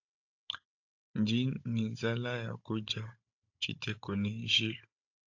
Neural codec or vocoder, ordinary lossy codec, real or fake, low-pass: codec, 16 kHz, 16 kbps, FunCodec, trained on Chinese and English, 50 frames a second; AAC, 48 kbps; fake; 7.2 kHz